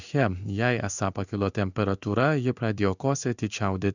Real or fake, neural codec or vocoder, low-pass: fake; codec, 16 kHz in and 24 kHz out, 1 kbps, XY-Tokenizer; 7.2 kHz